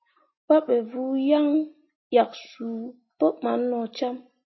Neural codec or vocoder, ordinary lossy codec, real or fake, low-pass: none; MP3, 24 kbps; real; 7.2 kHz